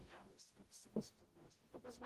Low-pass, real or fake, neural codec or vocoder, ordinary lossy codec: 14.4 kHz; fake; codec, 44.1 kHz, 0.9 kbps, DAC; Opus, 16 kbps